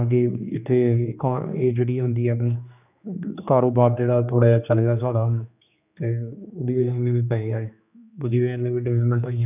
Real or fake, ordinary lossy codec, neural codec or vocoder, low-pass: fake; none; codec, 16 kHz, 2 kbps, X-Codec, HuBERT features, trained on general audio; 3.6 kHz